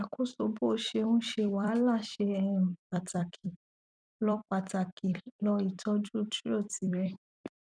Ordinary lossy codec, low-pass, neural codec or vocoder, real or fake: none; 9.9 kHz; vocoder, 44.1 kHz, 128 mel bands every 256 samples, BigVGAN v2; fake